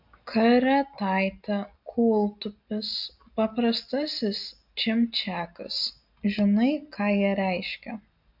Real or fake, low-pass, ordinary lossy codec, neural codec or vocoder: real; 5.4 kHz; MP3, 48 kbps; none